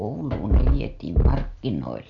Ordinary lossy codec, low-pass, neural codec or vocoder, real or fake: none; 7.2 kHz; none; real